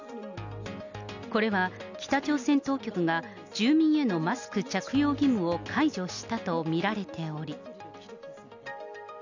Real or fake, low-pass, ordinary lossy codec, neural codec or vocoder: real; 7.2 kHz; none; none